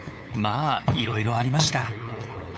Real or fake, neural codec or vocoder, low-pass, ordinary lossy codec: fake; codec, 16 kHz, 8 kbps, FunCodec, trained on LibriTTS, 25 frames a second; none; none